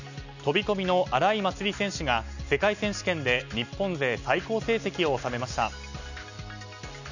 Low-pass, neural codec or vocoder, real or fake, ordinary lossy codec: 7.2 kHz; none; real; none